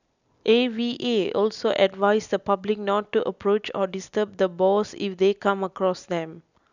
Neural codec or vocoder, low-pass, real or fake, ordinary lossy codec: none; 7.2 kHz; real; none